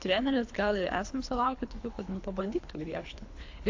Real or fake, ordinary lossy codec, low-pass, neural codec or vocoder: fake; AAC, 48 kbps; 7.2 kHz; codec, 24 kHz, 3 kbps, HILCodec